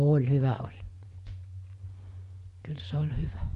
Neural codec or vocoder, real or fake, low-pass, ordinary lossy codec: vocoder, 24 kHz, 100 mel bands, Vocos; fake; 10.8 kHz; Opus, 64 kbps